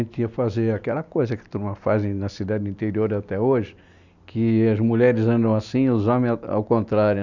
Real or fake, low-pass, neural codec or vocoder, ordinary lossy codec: real; 7.2 kHz; none; none